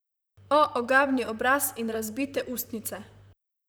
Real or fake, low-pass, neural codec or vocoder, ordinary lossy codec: fake; none; vocoder, 44.1 kHz, 128 mel bands, Pupu-Vocoder; none